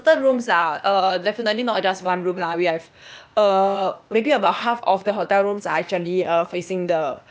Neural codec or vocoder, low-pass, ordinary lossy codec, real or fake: codec, 16 kHz, 0.8 kbps, ZipCodec; none; none; fake